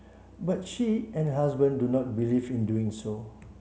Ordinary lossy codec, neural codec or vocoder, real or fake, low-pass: none; none; real; none